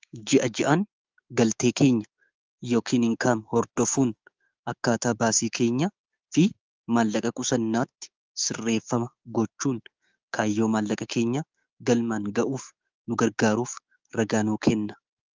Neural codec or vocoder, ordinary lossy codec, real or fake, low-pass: codec, 16 kHz, 6 kbps, DAC; Opus, 24 kbps; fake; 7.2 kHz